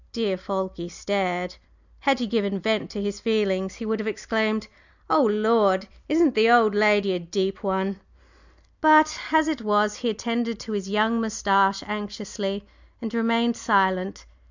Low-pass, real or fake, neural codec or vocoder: 7.2 kHz; real; none